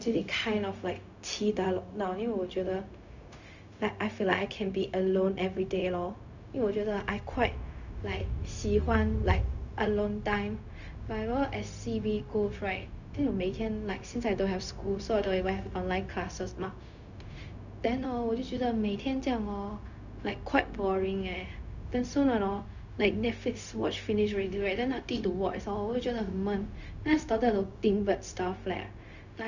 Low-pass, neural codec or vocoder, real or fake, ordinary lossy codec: 7.2 kHz; codec, 16 kHz, 0.4 kbps, LongCat-Audio-Codec; fake; none